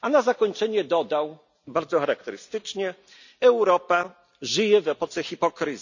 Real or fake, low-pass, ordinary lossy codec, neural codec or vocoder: real; 7.2 kHz; none; none